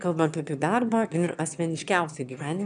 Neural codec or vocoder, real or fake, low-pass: autoencoder, 22.05 kHz, a latent of 192 numbers a frame, VITS, trained on one speaker; fake; 9.9 kHz